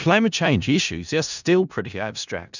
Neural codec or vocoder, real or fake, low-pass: codec, 16 kHz in and 24 kHz out, 0.4 kbps, LongCat-Audio-Codec, four codebook decoder; fake; 7.2 kHz